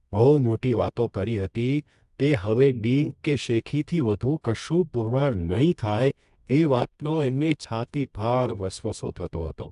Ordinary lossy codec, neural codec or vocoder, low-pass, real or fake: AAC, 96 kbps; codec, 24 kHz, 0.9 kbps, WavTokenizer, medium music audio release; 10.8 kHz; fake